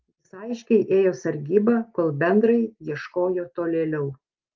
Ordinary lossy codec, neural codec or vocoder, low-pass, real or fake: Opus, 32 kbps; none; 7.2 kHz; real